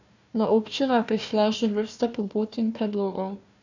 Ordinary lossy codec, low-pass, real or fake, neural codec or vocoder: none; 7.2 kHz; fake; codec, 16 kHz, 1 kbps, FunCodec, trained on Chinese and English, 50 frames a second